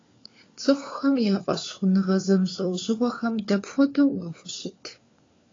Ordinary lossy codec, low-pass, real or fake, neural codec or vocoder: AAC, 32 kbps; 7.2 kHz; fake; codec, 16 kHz, 4 kbps, FunCodec, trained on LibriTTS, 50 frames a second